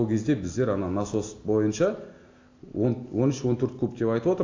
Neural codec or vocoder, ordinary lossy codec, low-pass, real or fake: none; AAC, 48 kbps; 7.2 kHz; real